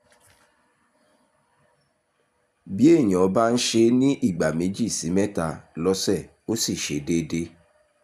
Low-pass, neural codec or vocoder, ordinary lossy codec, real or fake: 14.4 kHz; none; MP3, 96 kbps; real